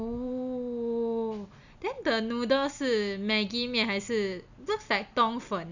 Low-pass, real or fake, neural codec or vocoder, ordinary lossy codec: 7.2 kHz; real; none; none